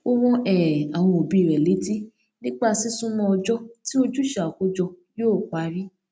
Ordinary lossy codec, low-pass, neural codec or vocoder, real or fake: none; none; none; real